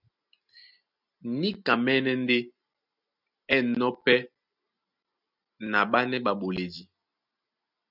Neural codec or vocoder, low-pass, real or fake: none; 5.4 kHz; real